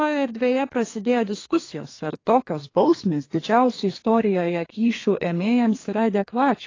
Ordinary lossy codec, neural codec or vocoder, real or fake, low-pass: AAC, 32 kbps; codec, 32 kHz, 1.9 kbps, SNAC; fake; 7.2 kHz